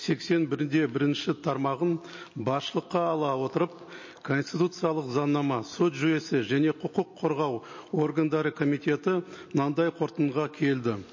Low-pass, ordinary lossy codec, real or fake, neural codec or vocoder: 7.2 kHz; MP3, 32 kbps; real; none